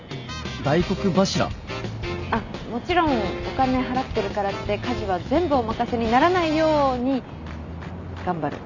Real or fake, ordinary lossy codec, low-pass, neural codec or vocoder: real; none; 7.2 kHz; none